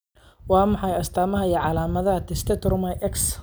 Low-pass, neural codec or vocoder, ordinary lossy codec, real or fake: none; none; none; real